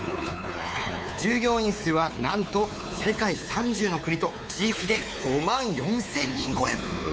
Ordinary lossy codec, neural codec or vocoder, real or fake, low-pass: none; codec, 16 kHz, 4 kbps, X-Codec, WavLM features, trained on Multilingual LibriSpeech; fake; none